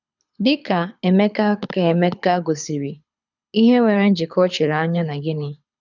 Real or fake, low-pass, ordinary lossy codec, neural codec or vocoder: fake; 7.2 kHz; none; codec, 24 kHz, 6 kbps, HILCodec